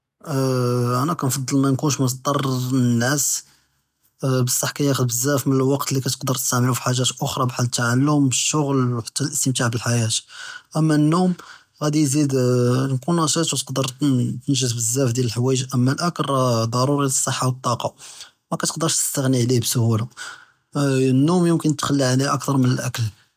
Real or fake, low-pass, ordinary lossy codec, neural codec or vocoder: real; 14.4 kHz; none; none